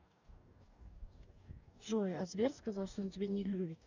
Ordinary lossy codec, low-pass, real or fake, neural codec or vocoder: none; 7.2 kHz; fake; codec, 44.1 kHz, 2.6 kbps, DAC